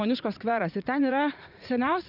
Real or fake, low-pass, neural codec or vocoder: fake; 5.4 kHz; vocoder, 44.1 kHz, 80 mel bands, Vocos